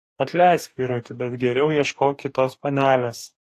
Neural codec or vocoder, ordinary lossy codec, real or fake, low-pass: codec, 44.1 kHz, 2.6 kbps, DAC; AAC, 64 kbps; fake; 14.4 kHz